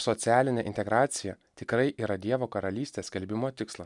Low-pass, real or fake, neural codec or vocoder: 10.8 kHz; real; none